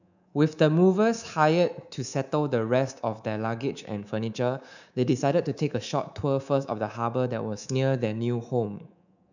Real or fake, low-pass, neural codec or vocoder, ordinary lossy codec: fake; 7.2 kHz; codec, 24 kHz, 3.1 kbps, DualCodec; none